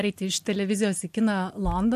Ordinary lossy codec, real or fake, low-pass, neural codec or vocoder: MP3, 64 kbps; real; 14.4 kHz; none